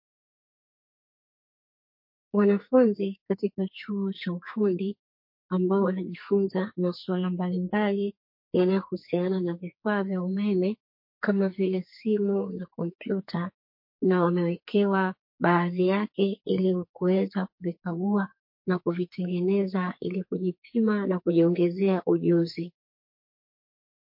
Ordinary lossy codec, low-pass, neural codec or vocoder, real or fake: MP3, 32 kbps; 5.4 kHz; codec, 44.1 kHz, 2.6 kbps, SNAC; fake